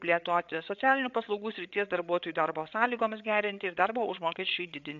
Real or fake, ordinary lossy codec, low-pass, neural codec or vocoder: fake; AAC, 64 kbps; 7.2 kHz; codec, 16 kHz, 8 kbps, FreqCodec, larger model